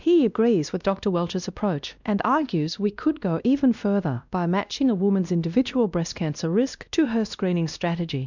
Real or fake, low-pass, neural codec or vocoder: fake; 7.2 kHz; codec, 16 kHz, 1 kbps, X-Codec, WavLM features, trained on Multilingual LibriSpeech